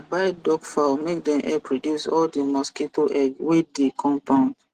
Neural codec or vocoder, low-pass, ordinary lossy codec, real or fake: none; 14.4 kHz; Opus, 16 kbps; real